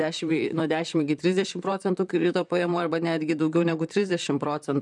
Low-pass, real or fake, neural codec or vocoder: 10.8 kHz; fake; vocoder, 44.1 kHz, 128 mel bands, Pupu-Vocoder